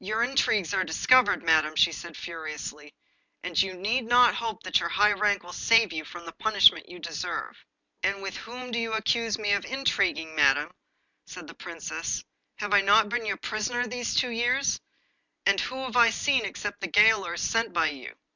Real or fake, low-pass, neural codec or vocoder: real; 7.2 kHz; none